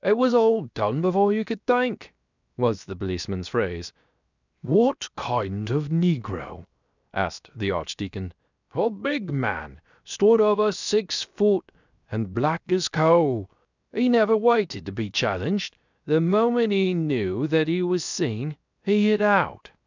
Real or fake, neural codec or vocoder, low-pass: fake; codec, 16 kHz, 0.7 kbps, FocalCodec; 7.2 kHz